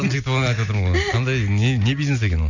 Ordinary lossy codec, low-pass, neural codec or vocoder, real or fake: none; 7.2 kHz; none; real